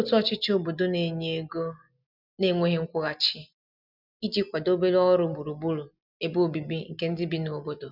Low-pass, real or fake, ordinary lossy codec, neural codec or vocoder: 5.4 kHz; real; none; none